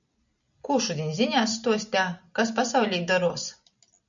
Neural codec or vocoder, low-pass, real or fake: none; 7.2 kHz; real